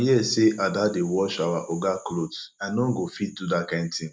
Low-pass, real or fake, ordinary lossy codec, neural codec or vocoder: none; real; none; none